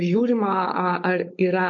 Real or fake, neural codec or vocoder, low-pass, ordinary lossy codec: fake; codec, 16 kHz, 4.8 kbps, FACodec; 7.2 kHz; MP3, 48 kbps